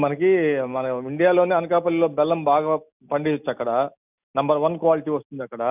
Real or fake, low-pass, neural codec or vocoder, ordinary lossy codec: real; 3.6 kHz; none; none